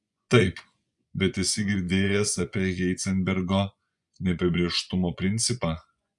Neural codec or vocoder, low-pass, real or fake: vocoder, 24 kHz, 100 mel bands, Vocos; 10.8 kHz; fake